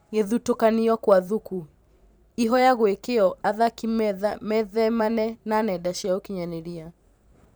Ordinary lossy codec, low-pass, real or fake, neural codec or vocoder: none; none; fake; vocoder, 44.1 kHz, 128 mel bands every 512 samples, BigVGAN v2